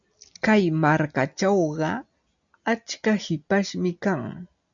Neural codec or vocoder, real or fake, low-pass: none; real; 7.2 kHz